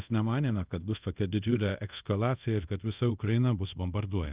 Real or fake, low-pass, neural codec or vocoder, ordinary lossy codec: fake; 3.6 kHz; codec, 24 kHz, 0.5 kbps, DualCodec; Opus, 24 kbps